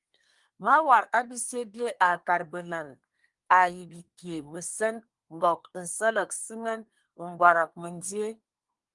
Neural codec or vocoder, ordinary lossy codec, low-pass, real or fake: codec, 24 kHz, 1 kbps, SNAC; Opus, 32 kbps; 10.8 kHz; fake